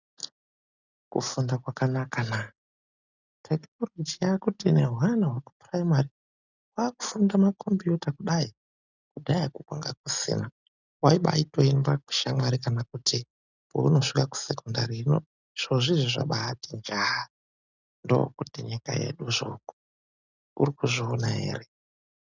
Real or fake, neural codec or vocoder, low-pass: real; none; 7.2 kHz